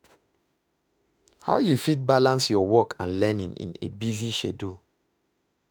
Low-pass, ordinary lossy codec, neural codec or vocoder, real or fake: none; none; autoencoder, 48 kHz, 32 numbers a frame, DAC-VAE, trained on Japanese speech; fake